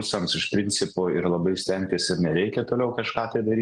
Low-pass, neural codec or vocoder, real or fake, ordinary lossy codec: 10.8 kHz; none; real; Opus, 24 kbps